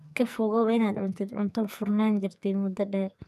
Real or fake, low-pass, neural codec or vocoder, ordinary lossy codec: fake; 14.4 kHz; codec, 44.1 kHz, 2.6 kbps, SNAC; none